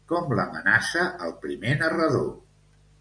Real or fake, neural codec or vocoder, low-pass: real; none; 9.9 kHz